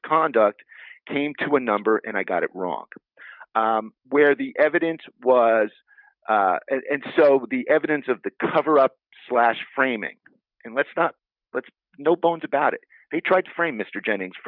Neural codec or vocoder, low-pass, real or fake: none; 5.4 kHz; real